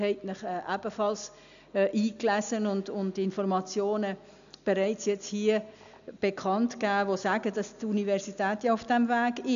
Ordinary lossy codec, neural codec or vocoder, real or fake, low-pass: none; none; real; 7.2 kHz